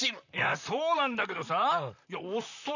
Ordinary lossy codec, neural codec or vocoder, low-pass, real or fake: none; vocoder, 44.1 kHz, 128 mel bands, Pupu-Vocoder; 7.2 kHz; fake